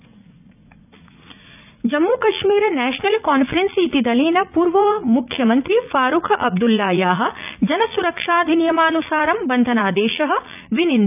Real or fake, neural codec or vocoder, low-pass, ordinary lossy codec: fake; vocoder, 44.1 kHz, 80 mel bands, Vocos; 3.6 kHz; none